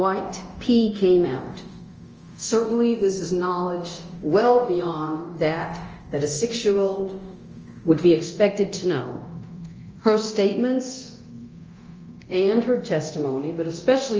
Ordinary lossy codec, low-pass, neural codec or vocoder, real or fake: Opus, 24 kbps; 7.2 kHz; codec, 24 kHz, 0.9 kbps, DualCodec; fake